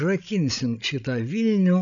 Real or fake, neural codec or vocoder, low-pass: fake; codec, 16 kHz, 16 kbps, FreqCodec, larger model; 7.2 kHz